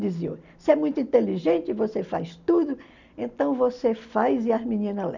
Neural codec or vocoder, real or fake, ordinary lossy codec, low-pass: none; real; none; 7.2 kHz